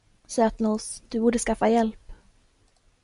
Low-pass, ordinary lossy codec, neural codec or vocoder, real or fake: 10.8 kHz; MP3, 96 kbps; none; real